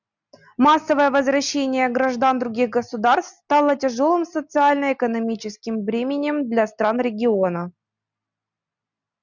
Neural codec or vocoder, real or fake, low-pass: none; real; 7.2 kHz